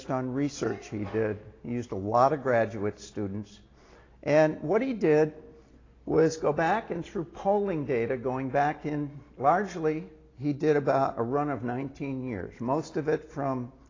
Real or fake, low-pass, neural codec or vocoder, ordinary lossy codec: real; 7.2 kHz; none; AAC, 32 kbps